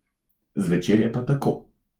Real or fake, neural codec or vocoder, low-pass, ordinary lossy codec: fake; autoencoder, 48 kHz, 128 numbers a frame, DAC-VAE, trained on Japanese speech; 19.8 kHz; Opus, 24 kbps